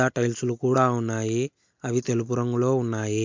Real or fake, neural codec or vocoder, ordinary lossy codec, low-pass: real; none; MP3, 64 kbps; 7.2 kHz